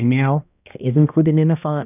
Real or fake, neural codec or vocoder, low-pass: fake; codec, 16 kHz, 1 kbps, X-Codec, HuBERT features, trained on balanced general audio; 3.6 kHz